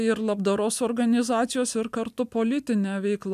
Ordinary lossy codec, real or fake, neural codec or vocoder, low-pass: MP3, 96 kbps; real; none; 14.4 kHz